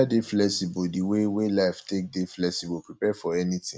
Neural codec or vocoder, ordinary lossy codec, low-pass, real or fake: none; none; none; real